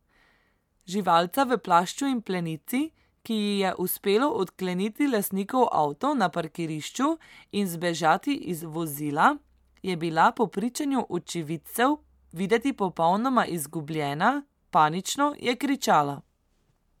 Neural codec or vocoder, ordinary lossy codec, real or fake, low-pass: none; MP3, 96 kbps; real; 19.8 kHz